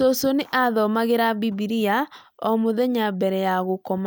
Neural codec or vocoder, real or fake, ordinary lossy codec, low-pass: none; real; none; none